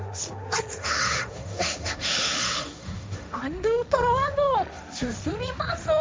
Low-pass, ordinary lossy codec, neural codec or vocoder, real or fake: none; none; codec, 16 kHz, 1.1 kbps, Voila-Tokenizer; fake